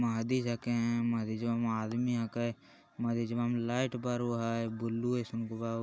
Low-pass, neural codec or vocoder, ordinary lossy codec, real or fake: none; none; none; real